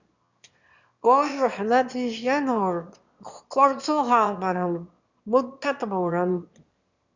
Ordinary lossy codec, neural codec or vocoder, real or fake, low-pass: Opus, 64 kbps; autoencoder, 22.05 kHz, a latent of 192 numbers a frame, VITS, trained on one speaker; fake; 7.2 kHz